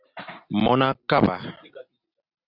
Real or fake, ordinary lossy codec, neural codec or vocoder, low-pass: real; Opus, 64 kbps; none; 5.4 kHz